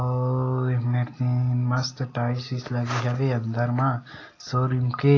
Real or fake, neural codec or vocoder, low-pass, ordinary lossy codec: real; none; 7.2 kHz; AAC, 32 kbps